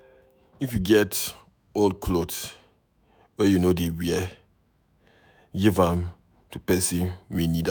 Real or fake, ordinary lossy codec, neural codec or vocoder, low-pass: fake; none; autoencoder, 48 kHz, 128 numbers a frame, DAC-VAE, trained on Japanese speech; none